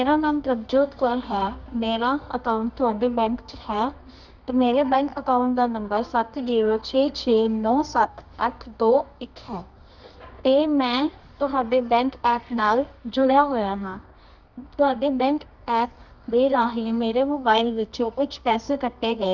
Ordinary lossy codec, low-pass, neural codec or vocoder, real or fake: none; 7.2 kHz; codec, 24 kHz, 0.9 kbps, WavTokenizer, medium music audio release; fake